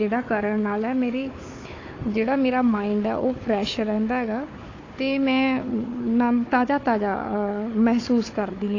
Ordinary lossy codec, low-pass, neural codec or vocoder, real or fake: AAC, 32 kbps; 7.2 kHz; codec, 16 kHz, 4 kbps, FunCodec, trained on Chinese and English, 50 frames a second; fake